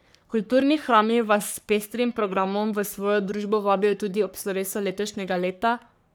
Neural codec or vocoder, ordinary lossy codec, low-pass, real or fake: codec, 44.1 kHz, 3.4 kbps, Pupu-Codec; none; none; fake